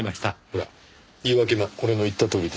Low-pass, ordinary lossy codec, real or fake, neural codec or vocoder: none; none; real; none